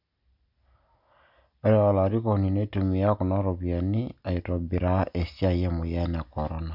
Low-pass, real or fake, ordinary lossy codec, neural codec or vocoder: 5.4 kHz; real; none; none